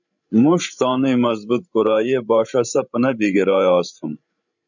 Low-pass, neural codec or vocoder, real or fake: 7.2 kHz; codec, 16 kHz, 16 kbps, FreqCodec, larger model; fake